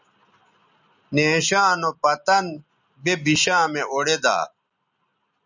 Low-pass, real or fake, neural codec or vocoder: 7.2 kHz; real; none